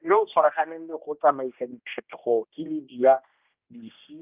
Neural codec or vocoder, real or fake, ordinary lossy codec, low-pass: codec, 16 kHz, 1 kbps, X-Codec, HuBERT features, trained on general audio; fake; Opus, 24 kbps; 3.6 kHz